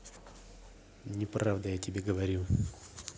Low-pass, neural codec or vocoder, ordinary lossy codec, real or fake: none; none; none; real